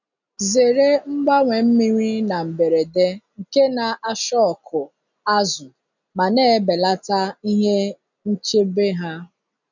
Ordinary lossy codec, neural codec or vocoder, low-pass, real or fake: none; none; 7.2 kHz; real